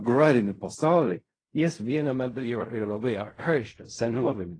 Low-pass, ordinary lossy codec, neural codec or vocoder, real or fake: 9.9 kHz; AAC, 32 kbps; codec, 16 kHz in and 24 kHz out, 0.4 kbps, LongCat-Audio-Codec, fine tuned four codebook decoder; fake